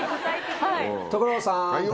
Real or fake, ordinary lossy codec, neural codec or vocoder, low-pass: real; none; none; none